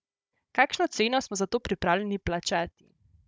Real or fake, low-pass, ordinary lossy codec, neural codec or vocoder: fake; none; none; codec, 16 kHz, 16 kbps, FunCodec, trained on Chinese and English, 50 frames a second